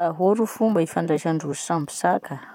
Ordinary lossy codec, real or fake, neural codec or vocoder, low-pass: none; fake; vocoder, 44.1 kHz, 128 mel bands, Pupu-Vocoder; 19.8 kHz